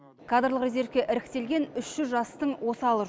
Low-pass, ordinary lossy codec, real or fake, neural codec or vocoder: none; none; real; none